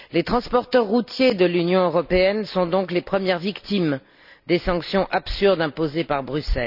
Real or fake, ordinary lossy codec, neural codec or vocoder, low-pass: real; none; none; 5.4 kHz